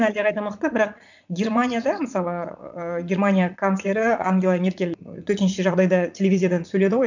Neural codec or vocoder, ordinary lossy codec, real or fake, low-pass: vocoder, 44.1 kHz, 80 mel bands, Vocos; none; fake; 7.2 kHz